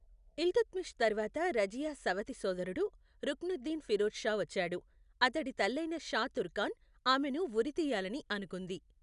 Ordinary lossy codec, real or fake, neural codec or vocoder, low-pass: none; real; none; 10.8 kHz